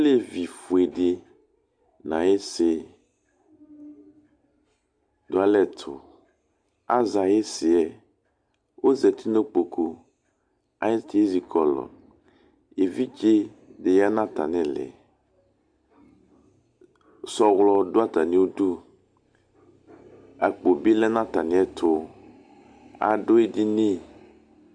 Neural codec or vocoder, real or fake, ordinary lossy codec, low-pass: none; real; Opus, 64 kbps; 9.9 kHz